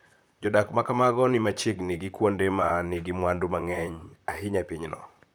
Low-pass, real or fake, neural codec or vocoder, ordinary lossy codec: none; fake; vocoder, 44.1 kHz, 128 mel bands, Pupu-Vocoder; none